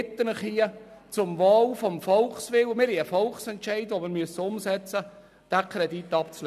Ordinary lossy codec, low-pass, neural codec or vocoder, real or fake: none; 14.4 kHz; none; real